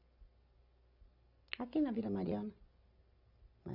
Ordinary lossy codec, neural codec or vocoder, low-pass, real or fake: none; none; 5.4 kHz; real